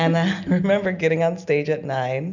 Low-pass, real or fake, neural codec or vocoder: 7.2 kHz; real; none